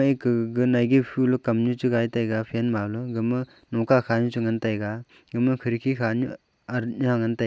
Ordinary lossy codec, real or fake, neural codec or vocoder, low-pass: none; real; none; none